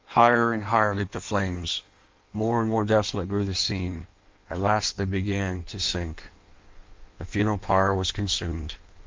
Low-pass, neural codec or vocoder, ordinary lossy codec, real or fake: 7.2 kHz; codec, 16 kHz in and 24 kHz out, 1.1 kbps, FireRedTTS-2 codec; Opus, 32 kbps; fake